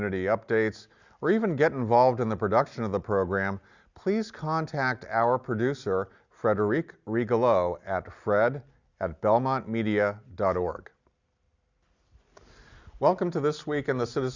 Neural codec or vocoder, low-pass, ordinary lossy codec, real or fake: none; 7.2 kHz; Opus, 64 kbps; real